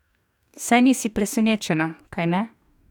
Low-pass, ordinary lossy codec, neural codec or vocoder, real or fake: 19.8 kHz; none; codec, 44.1 kHz, 2.6 kbps, DAC; fake